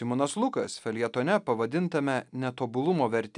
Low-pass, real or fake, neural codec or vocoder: 10.8 kHz; real; none